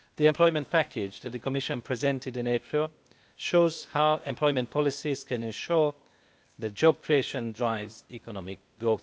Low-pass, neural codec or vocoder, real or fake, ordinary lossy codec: none; codec, 16 kHz, 0.8 kbps, ZipCodec; fake; none